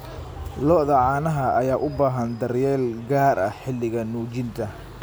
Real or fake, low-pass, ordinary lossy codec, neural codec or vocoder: real; none; none; none